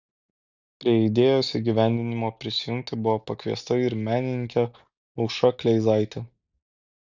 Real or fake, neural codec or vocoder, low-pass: real; none; 7.2 kHz